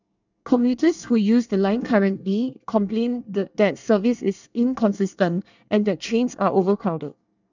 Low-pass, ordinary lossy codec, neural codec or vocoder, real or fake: 7.2 kHz; none; codec, 24 kHz, 1 kbps, SNAC; fake